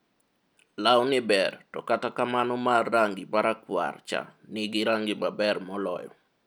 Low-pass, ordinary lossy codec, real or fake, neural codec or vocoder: none; none; real; none